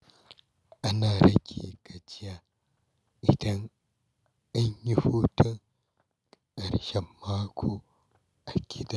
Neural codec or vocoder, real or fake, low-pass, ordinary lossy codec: none; real; none; none